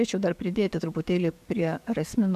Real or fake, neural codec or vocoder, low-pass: fake; codec, 44.1 kHz, 7.8 kbps, DAC; 14.4 kHz